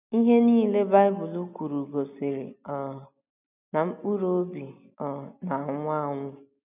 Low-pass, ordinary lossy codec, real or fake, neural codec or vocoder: 3.6 kHz; none; real; none